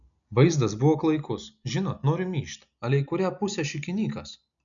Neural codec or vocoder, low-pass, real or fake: none; 7.2 kHz; real